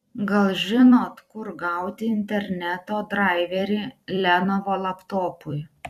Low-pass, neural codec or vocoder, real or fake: 14.4 kHz; vocoder, 44.1 kHz, 128 mel bands every 256 samples, BigVGAN v2; fake